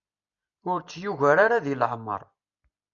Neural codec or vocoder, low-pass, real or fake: none; 7.2 kHz; real